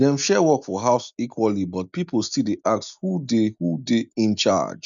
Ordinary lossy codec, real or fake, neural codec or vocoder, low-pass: none; real; none; 7.2 kHz